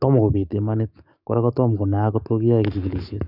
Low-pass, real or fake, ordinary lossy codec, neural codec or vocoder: 5.4 kHz; fake; none; codec, 44.1 kHz, 7.8 kbps, DAC